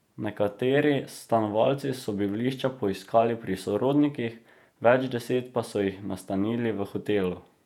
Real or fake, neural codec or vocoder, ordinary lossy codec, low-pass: fake; vocoder, 48 kHz, 128 mel bands, Vocos; none; 19.8 kHz